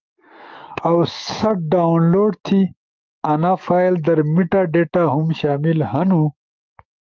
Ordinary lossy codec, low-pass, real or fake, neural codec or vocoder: Opus, 32 kbps; 7.2 kHz; fake; autoencoder, 48 kHz, 128 numbers a frame, DAC-VAE, trained on Japanese speech